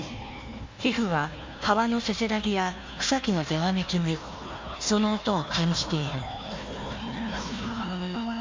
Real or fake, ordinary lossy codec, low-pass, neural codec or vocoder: fake; MP3, 48 kbps; 7.2 kHz; codec, 16 kHz, 1 kbps, FunCodec, trained on Chinese and English, 50 frames a second